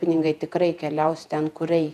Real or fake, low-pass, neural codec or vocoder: fake; 14.4 kHz; vocoder, 48 kHz, 128 mel bands, Vocos